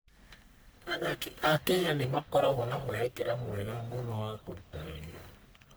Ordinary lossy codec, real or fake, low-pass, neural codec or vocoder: none; fake; none; codec, 44.1 kHz, 1.7 kbps, Pupu-Codec